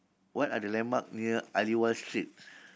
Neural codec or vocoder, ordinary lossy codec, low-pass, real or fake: none; none; none; real